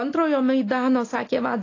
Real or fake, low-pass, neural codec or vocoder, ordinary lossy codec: real; 7.2 kHz; none; AAC, 32 kbps